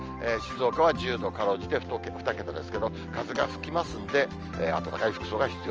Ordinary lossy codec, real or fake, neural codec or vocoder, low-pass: Opus, 24 kbps; real; none; 7.2 kHz